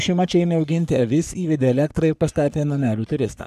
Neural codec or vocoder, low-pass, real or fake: codec, 44.1 kHz, 3.4 kbps, Pupu-Codec; 14.4 kHz; fake